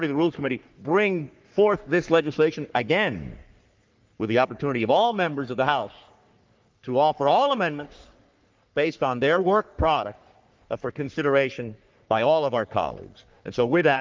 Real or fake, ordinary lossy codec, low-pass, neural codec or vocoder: fake; Opus, 24 kbps; 7.2 kHz; codec, 44.1 kHz, 3.4 kbps, Pupu-Codec